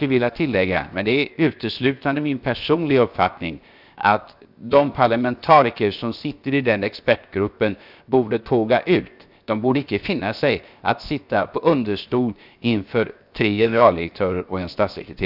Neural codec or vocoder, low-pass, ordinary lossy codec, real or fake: codec, 16 kHz, 0.7 kbps, FocalCodec; 5.4 kHz; none; fake